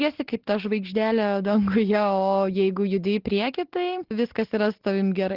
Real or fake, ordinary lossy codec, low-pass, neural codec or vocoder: real; Opus, 16 kbps; 5.4 kHz; none